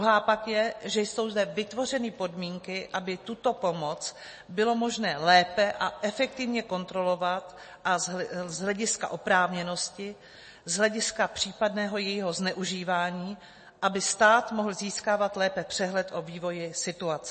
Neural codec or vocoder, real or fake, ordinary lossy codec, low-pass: none; real; MP3, 32 kbps; 10.8 kHz